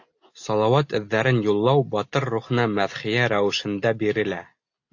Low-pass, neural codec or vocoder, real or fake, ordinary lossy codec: 7.2 kHz; none; real; AAC, 48 kbps